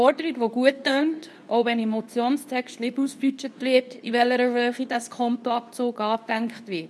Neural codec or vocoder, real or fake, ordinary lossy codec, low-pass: codec, 24 kHz, 0.9 kbps, WavTokenizer, medium speech release version 2; fake; none; none